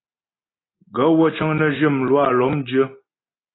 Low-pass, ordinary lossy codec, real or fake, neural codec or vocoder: 7.2 kHz; AAC, 16 kbps; real; none